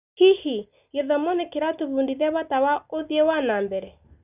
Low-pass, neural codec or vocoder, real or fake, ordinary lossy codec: 3.6 kHz; none; real; none